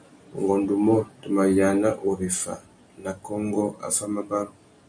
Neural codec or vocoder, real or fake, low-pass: none; real; 9.9 kHz